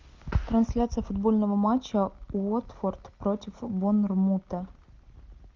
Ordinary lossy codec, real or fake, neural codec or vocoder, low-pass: Opus, 32 kbps; real; none; 7.2 kHz